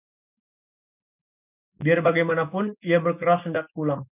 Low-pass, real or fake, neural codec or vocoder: 3.6 kHz; real; none